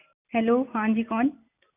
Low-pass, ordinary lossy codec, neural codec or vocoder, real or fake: 3.6 kHz; none; none; real